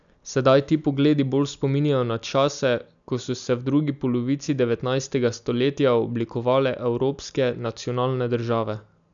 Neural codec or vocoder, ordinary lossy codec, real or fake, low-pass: none; none; real; 7.2 kHz